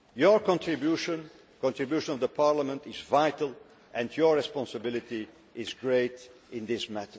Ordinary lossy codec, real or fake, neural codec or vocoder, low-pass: none; real; none; none